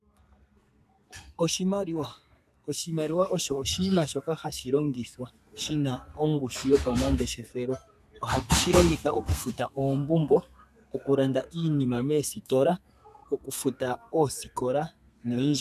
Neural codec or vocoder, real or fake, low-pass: codec, 32 kHz, 1.9 kbps, SNAC; fake; 14.4 kHz